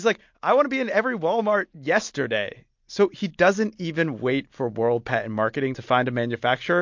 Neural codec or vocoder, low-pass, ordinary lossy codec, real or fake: none; 7.2 kHz; MP3, 48 kbps; real